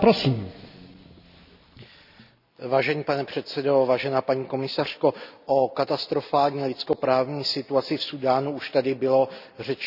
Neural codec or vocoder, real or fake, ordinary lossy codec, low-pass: none; real; none; 5.4 kHz